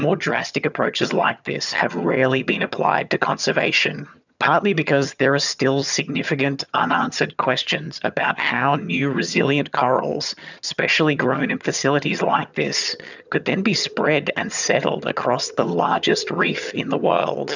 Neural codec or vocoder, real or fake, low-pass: vocoder, 22.05 kHz, 80 mel bands, HiFi-GAN; fake; 7.2 kHz